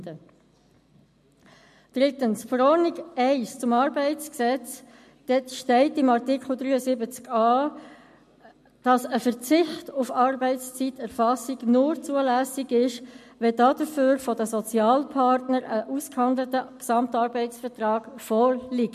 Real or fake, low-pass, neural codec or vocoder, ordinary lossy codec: real; 14.4 kHz; none; MP3, 64 kbps